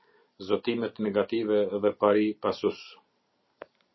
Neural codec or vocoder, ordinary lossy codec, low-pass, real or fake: none; MP3, 24 kbps; 7.2 kHz; real